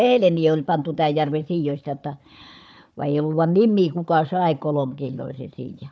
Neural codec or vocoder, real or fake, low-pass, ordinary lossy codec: codec, 16 kHz, 4 kbps, FunCodec, trained on Chinese and English, 50 frames a second; fake; none; none